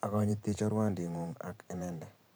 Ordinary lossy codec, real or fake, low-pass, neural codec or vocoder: none; fake; none; vocoder, 44.1 kHz, 128 mel bands every 512 samples, BigVGAN v2